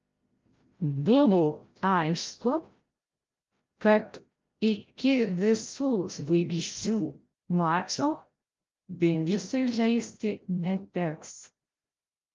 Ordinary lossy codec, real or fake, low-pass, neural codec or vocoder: Opus, 32 kbps; fake; 7.2 kHz; codec, 16 kHz, 0.5 kbps, FreqCodec, larger model